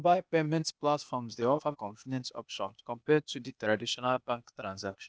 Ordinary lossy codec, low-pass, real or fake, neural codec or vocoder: none; none; fake; codec, 16 kHz, 0.8 kbps, ZipCodec